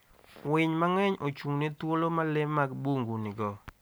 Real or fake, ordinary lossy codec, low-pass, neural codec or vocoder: real; none; none; none